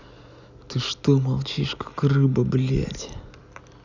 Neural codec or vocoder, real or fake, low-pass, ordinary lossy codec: none; real; 7.2 kHz; none